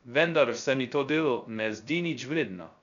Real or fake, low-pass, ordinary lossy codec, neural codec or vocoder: fake; 7.2 kHz; none; codec, 16 kHz, 0.2 kbps, FocalCodec